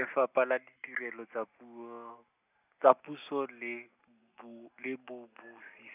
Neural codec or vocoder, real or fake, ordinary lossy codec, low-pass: none; real; none; 3.6 kHz